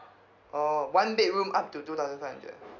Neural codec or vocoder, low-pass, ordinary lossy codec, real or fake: none; 7.2 kHz; none; real